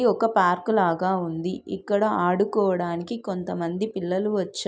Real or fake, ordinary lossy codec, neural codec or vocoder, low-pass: real; none; none; none